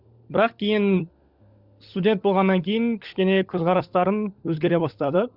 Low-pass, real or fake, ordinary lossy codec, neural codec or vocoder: 5.4 kHz; fake; Opus, 64 kbps; codec, 16 kHz, 16 kbps, FunCodec, trained on LibriTTS, 50 frames a second